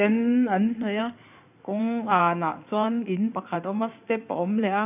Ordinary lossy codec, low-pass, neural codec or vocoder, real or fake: none; 3.6 kHz; codec, 16 kHz in and 24 kHz out, 2.2 kbps, FireRedTTS-2 codec; fake